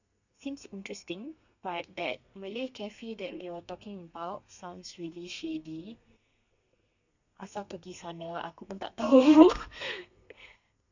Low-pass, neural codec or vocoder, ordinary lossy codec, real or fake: 7.2 kHz; codec, 32 kHz, 1.9 kbps, SNAC; none; fake